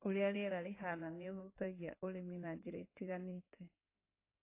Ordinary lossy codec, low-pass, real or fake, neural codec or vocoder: AAC, 24 kbps; 3.6 kHz; fake; codec, 16 kHz in and 24 kHz out, 2.2 kbps, FireRedTTS-2 codec